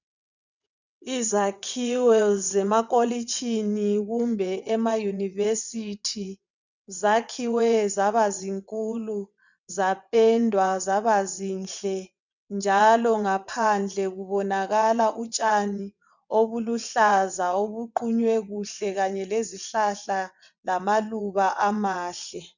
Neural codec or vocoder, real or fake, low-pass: vocoder, 22.05 kHz, 80 mel bands, WaveNeXt; fake; 7.2 kHz